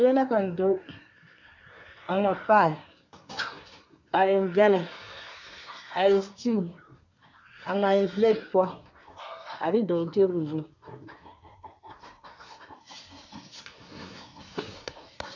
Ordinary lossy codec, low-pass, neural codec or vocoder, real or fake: MP3, 64 kbps; 7.2 kHz; codec, 24 kHz, 1 kbps, SNAC; fake